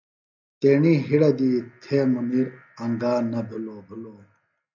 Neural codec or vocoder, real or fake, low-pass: none; real; 7.2 kHz